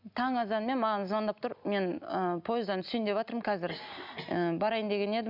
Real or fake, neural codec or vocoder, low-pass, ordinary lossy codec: real; none; 5.4 kHz; none